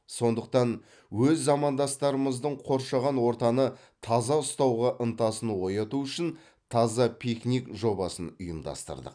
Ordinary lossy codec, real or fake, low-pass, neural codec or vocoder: none; real; 9.9 kHz; none